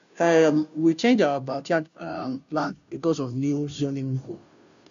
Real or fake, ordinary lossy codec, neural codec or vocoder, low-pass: fake; none; codec, 16 kHz, 0.5 kbps, FunCodec, trained on Chinese and English, 25 frames a second; 7.2 kHz